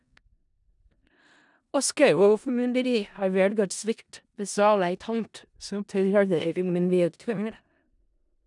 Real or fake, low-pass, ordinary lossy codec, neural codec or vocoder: fake; 10.8 kHz; none; codec, 16 kHz in and 24 kHz out, 0.4 kbps, LongCat-Audio-Codec, four codebook decoder